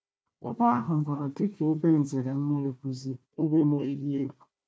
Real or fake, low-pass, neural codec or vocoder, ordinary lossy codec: fake; none; codec, 16 kHz, 1 kbps, FunCodec, trained on Chinese and English, 50 frames a second; none